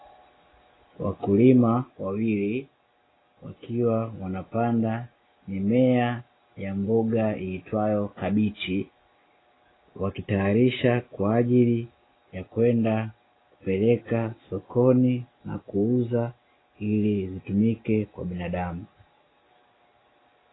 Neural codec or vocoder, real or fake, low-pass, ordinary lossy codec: none; real; 7.2 kHz; AAC, 16 kbps